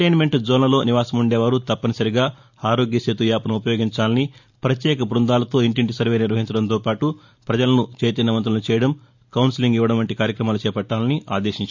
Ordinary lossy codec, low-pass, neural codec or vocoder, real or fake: none; 7.2 kHz; none; real